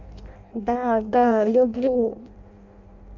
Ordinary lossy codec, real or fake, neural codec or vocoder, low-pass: Opus, 64 kbps; fake; codec, 16 kHz in and 24 kHz out, 0.6 kbps, FireRedTTS-2 codec; 7.2 kHz